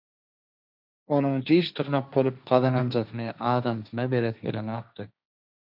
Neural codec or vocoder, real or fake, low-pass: codec, 16 kHz, 1.1 kbps, Voila-Tokenizer; fake; 5.4 kHz